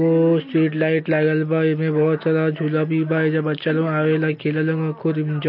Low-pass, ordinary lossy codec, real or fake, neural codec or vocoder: 5.4 kHz; MP3, 32 kbps; real; none